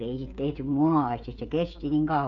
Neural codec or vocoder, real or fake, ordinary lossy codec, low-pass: codec, 16 kHz, 16 kbps, FreqCodec, smaller model; fake; none; 7.2 kHz